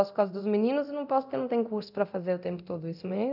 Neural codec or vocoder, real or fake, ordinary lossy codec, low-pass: codec, 24 kHz, 0.9 kbps, DualCodec; fake; none; 5.4 kHz